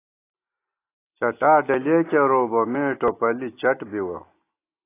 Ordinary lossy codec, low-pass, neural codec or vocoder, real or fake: AAC, 24 kbps; 3.6 kHz; none; real